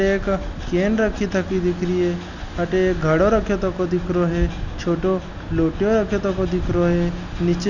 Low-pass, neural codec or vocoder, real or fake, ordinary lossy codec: 7.2 kHz; none; real; none